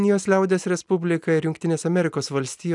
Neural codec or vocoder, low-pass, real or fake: none; 10.8 kHz; real